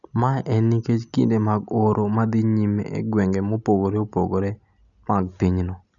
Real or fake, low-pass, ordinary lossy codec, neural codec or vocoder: real; 7.2 kHz; none; none